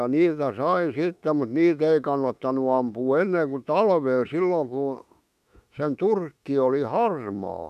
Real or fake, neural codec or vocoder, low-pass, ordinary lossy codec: fake; autoencoder, 48 kHz, 32 numbers a frame, DAC-VAE, trained on Japanese speech; 14.4 kHz; none